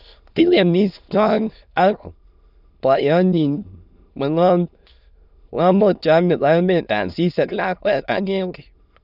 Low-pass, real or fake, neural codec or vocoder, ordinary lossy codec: 5.4 kHz; fake; autoencoder, 22.05 kHz, a latent of 192 numbers a frame, VITS, trained on many speakers; none